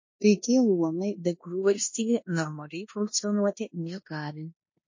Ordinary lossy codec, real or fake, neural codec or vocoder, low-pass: MP3, 32 kbps; fake; codec, 16 kHz in and 24 kHz out, 0.9 kbps, LongCat-Audio-Codec, four codebook decoder; 7.2 kHz